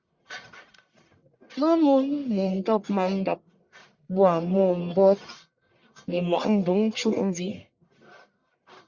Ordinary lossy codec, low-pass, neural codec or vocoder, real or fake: Opus, 64 kbps; 7.2 kHz; codec, 44.1 kHz, 1.7 kbps, Pupu-Codec; fake